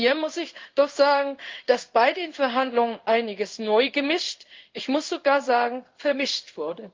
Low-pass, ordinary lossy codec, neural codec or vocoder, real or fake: 7.2 kHz; Opus, 24 kbps; codec, 16 kHz in and 24 kHz out, 1 kbps, XY-Tokenizer; fake